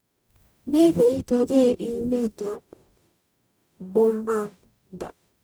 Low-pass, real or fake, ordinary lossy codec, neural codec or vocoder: none; fake; none; codec, 44.1 kHz, 0.9 kbps, DAC